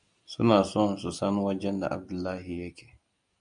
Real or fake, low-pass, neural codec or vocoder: real; 9.9 kHz; none